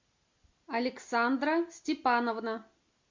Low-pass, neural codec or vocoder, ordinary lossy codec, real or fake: 7.2 kHz; none; MP3, 48 kbps; real